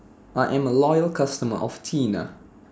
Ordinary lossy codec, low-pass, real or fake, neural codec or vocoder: none; none; real; none